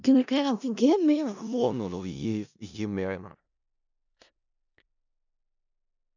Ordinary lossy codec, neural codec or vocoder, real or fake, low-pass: none; codec, 16 kHz in and 24 kHz out, 0.4 kbps, LongCat-Audio-Codec, four codebook decoder; fake; 7.2 kHz